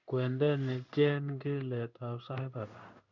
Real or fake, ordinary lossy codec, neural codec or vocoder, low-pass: fake; none; codec, 16 kHz in and 24 kHz out, 1 kbps, XY-Tokenizer; 7.2 kHz